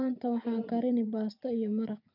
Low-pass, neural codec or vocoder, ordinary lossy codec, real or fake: 5.4 kHz; vocoder, 24 kHz, 100 mel bands, Vocos; none; fake